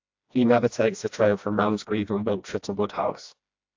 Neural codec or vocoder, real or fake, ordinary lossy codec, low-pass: codec, 16 kHz, 1 kbps, FreqCodec, smaller model; fake; AAC, 48 kbps; 7.2 kHz